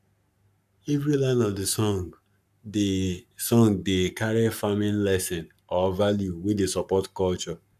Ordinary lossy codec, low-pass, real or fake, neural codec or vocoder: none; 14.4 kHz; fake; codec, 44.1 kHz, 7.8 kbps, Pupu-Codec